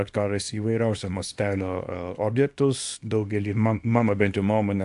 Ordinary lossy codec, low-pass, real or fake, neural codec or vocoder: AAC, 64 kbps; 10.8 kHz; fake; codec, 24 kHz, 0.9 kbps, WavTokenizer, small release